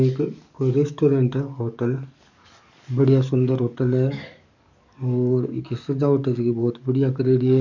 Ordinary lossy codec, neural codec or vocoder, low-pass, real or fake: none; codec, 44.1 kHz, 7.8 kbps, DAC; 7.2 kHz; fake